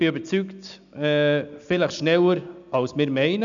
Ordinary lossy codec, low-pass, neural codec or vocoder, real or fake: none; 7.2 kHz; none; real